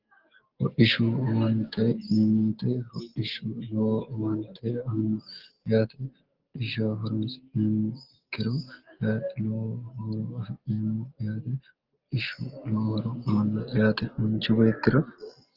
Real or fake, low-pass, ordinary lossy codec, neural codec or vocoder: real; 5.4 kHz; Opus, 16 kbps; none